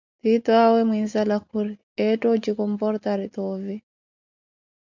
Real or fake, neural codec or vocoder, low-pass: real; none; 7.2 kHz